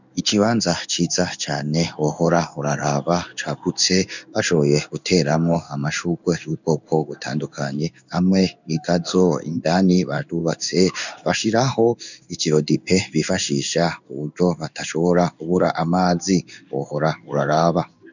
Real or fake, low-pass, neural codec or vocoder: fake; 7.2 kHz; codec, 16 kHz in and 24 kHz out, 1 kbps, XY-Tokenizer